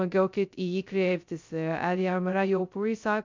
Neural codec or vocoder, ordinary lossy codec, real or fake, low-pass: codec, 16 kHz, 0.2 kbps, FocalCodec; MP3, 64 kbps; fake; 7.2 kHz